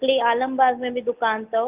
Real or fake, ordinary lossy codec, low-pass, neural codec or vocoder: real; Opus, 24 kbps; 3.6 kHz; none